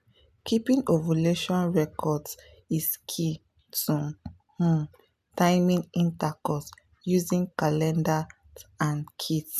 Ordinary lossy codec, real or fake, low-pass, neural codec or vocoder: none; real; 14.4 kHz; none